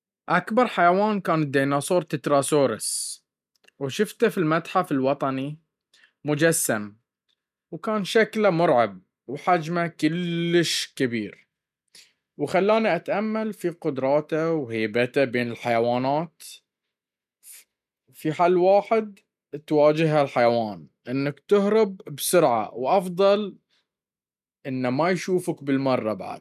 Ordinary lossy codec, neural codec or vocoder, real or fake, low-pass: none; none; real; 14.4 kHz